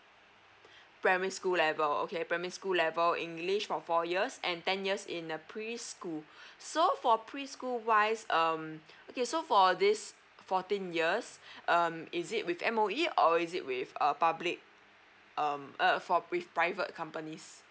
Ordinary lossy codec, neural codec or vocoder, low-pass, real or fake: none; none; none; real